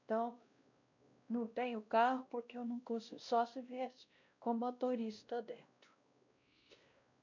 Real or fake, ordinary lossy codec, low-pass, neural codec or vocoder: fake; none; 7.2 kHz; codec, 16 kHz, 1 kbps, X-Codec, WavLM features, trained on Multilingual LibriSpeech